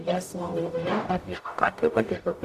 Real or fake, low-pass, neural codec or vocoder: fake; 14.4 kHz; codec, 44.1 kHz, 0.9 kbps, DAC